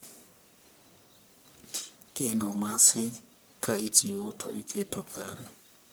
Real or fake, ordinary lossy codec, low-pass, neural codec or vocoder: fake; none; none; codec, 44.1 kHz, 1.7 kbps, Pupu-Codec